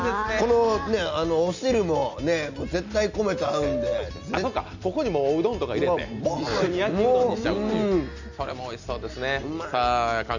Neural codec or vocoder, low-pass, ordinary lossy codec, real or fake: none; 7.2 kHz; none; real